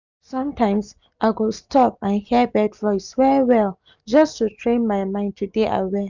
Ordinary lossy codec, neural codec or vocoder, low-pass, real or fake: none; none; 7.2 kHz; real